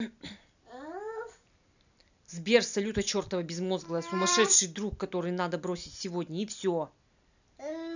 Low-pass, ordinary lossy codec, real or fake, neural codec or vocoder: 7.2 kHz; none; real; none